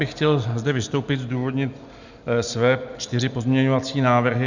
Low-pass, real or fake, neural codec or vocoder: 7.2 kHz; real; none